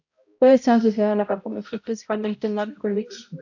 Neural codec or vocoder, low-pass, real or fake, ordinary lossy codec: codec, 16 kHz, 0.5 kbps, X-Codec, HuBERT features, trained on balanced general audio; 7.2 kHz; fake; AAC, 48 kbps